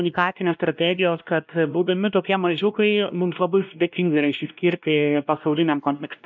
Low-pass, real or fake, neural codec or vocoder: 7.2 kHz; fake; codec, 16 kHz, 1 kbps, X-Codec, WavLM features, trained on Multilingual LibriSpeech